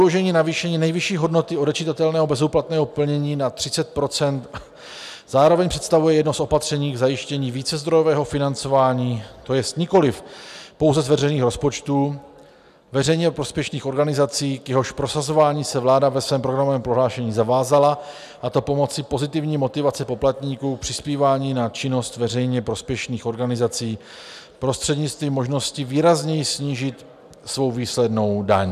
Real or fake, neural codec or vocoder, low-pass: real; none; 14.4 kHz